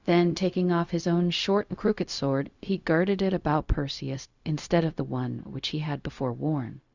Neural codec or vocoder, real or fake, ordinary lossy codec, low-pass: codec, 16 kHz, 0.4 kbps, LongCat-Audio-Codec; fake; Opus, 64 kbps; 7.2 kHz